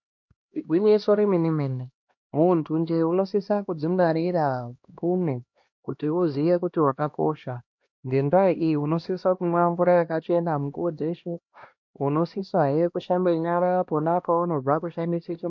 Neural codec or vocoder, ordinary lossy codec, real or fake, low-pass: codec, 16 kHz, 1 kbps, X-Codec, HuBERT features, trained on LibriSpeech; MP3, 48 kbps; fake; 7.2 kHz